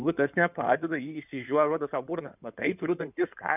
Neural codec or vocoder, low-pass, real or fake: codec, 16 kHz in and 24 kHz out, 2.2 kbps, FireRedTTS-2 codec; 3.6 kHz; fake